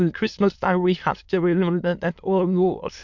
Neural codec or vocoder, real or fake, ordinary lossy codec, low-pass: autoencoder, 22.05 kHz, a latent of 192 numbers a frame, VITS, trained on many speakers; fake; MP3, 64 kbps; 7.2 kHz